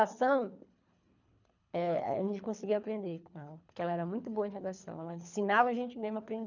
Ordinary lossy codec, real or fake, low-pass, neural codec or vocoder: none; fake; 7.2 kHz; codec, 24 kHz, 3 kbps, HILCodec